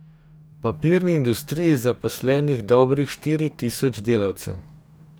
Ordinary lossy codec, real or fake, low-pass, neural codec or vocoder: none; fake; none; codec, 44.1 kHz, 2.6 kbps, DAC